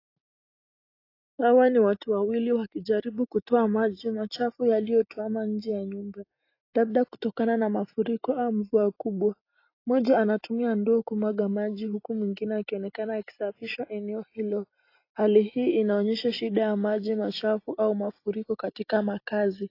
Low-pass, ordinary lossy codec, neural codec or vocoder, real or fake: 5.4 kHz; AAC, 32 kbps; none; real